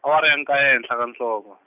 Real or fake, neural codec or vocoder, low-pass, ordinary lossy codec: real; none; 3.6 kHz; none